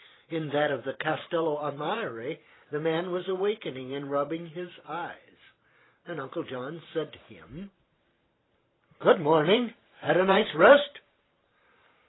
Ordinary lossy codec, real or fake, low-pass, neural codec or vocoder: AAC, 16 kbps; fake; 7.2 kHz; vocoder, 44.1 kHz, 128 mel bands every 512 samples, BigVGAN v2